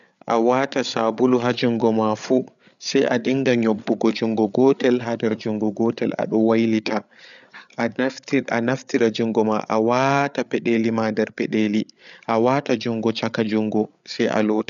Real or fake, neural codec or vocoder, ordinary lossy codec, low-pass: fake; codec, 16 kHz, 8 kbps, FreqCodec, larger model; none; 7.2 kHz